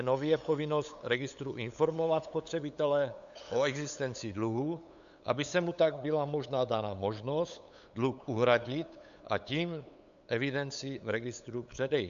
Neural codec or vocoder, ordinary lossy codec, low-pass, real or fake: codec, 16 kHz, 8 kbps, FunCodec, trained on LibriTTS, 25 frames a second; AAC, 96 kbps; 7.2 kHz; fake